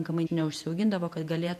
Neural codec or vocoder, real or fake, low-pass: vocoder, 48 kHz, 128 mel bands, Vocos; fake; 14.4 kHz